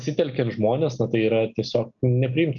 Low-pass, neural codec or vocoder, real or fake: 7.2 kHz; none; real